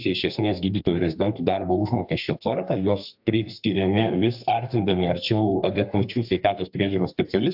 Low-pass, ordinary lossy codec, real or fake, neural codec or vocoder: 5.4 kHz; AAC, 48 kbps; fake; codec, 32 kHz, 1.9 kbps, SNAC